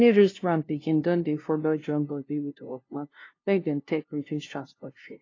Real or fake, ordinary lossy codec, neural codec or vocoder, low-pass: fake; AAC, 32 kbps; codec, 16 kHz, 0.5 kbps, FunCodec, trained on LibriTTS, 25 frames a second; 7.2 kHz